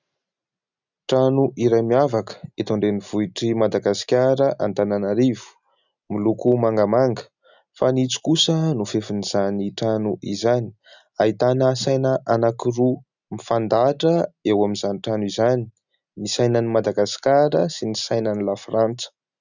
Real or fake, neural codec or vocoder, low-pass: real; none; 7.2 kHz